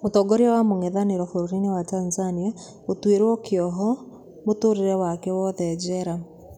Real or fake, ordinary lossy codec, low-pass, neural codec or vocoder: real; none; 19.8 kHz; none